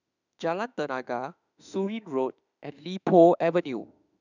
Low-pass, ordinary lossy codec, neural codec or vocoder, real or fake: 7.2 kHz; none; autoencoder, 48 kHz, 32 numbers a frame, DAC-VAE, trained on Japanese speech; fake